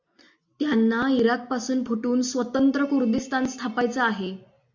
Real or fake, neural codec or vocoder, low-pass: real; none; 7.2 kHz